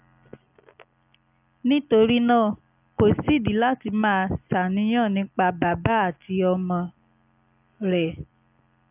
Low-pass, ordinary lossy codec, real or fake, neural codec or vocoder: 3.6 kHz; AAC, 32 kbps; real; none